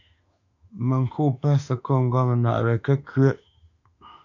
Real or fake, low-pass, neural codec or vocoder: fake; 7.2 kHz; autoencoder, 48 kHz, 32 numbers a frame, DAC-VAE, trained on Japanese speech